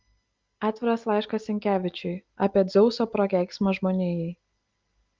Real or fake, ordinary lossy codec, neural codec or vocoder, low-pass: real; Opus, 32 kbps; none; 7.2 kHz